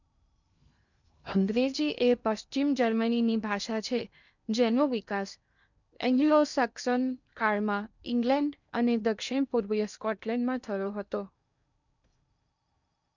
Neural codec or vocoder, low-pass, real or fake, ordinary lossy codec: codec, 16 kHz in and 24 kHz out, 0.8 kbps, FocalCodec, streaming, 65536 codes; 7.2 kHz; fake; none